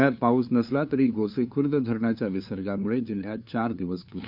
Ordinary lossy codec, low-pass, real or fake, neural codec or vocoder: none; 5.4 kHz; fake; codec, 16 kHz, 2 kbps, FunCodec, trained on LibriTTS, 25 frames a second